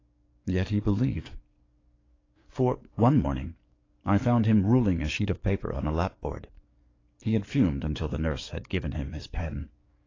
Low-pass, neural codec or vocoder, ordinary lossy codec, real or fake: 7.2 kHz; codec, 44.1 kHz, 7.8 kbps, DAC; AAC, 32 kbps; fake